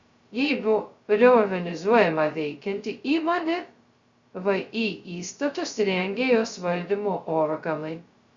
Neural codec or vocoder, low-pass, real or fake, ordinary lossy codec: codec, 16 kHz, 0.2 kbps, FocalCodec; 7.2 kHz; fake; Opus, 64 kbps